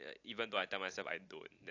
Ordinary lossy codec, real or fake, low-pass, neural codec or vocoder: AAC, 48 kbps; real; 7.2 kHz; none